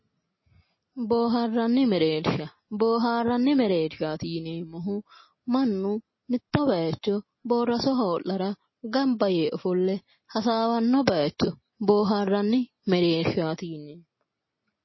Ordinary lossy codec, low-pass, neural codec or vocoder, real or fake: MP3, 24 kbps; 7.2 kHz; none; real